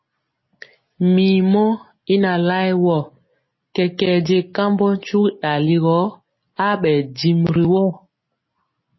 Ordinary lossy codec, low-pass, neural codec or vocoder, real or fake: MP3, 24 kbps; 7.2 kHz; none; real